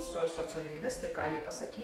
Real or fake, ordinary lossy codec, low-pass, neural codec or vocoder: fake; MP3, 64 kbps; 19.8 kHz; codec, 44.1 kHz, 2.6 kbps, DAC